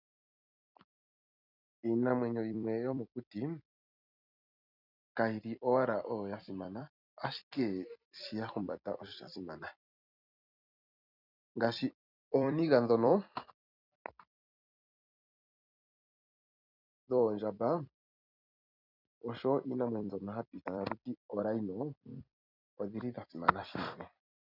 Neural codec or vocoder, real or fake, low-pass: vocoder, 44.1 kHz, 128 mel bands every 256 samples, BigVGAN v2; fake; 5.4 kHz